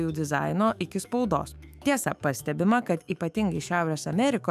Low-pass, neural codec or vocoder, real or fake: 14.4 kHz; autoencoder, 48 kHz, 128 numbers a frame, DAC-VAE, trained on Japanese speech; fake